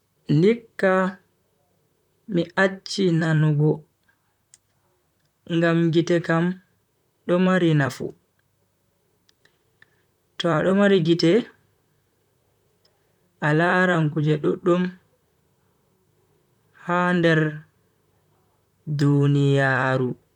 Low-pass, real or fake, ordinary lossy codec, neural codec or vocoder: 19.8 kHz; fake; none; vocoder, 44.1 kHz, 128 mel bands, Pupu-Vocoder